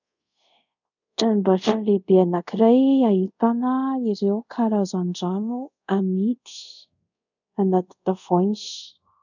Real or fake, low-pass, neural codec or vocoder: fake; 7.2 kHz; codec, 24 kHz, 0.5 kbps, DualCodec